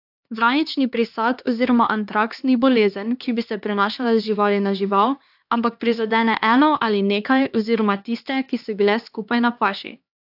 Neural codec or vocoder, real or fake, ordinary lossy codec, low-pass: codec, 16 kHz, 2 kbps, X-Codec, HuBERT features, trained on LibriSpeech; fake; AAC, 48 kbps; 5.4 kHz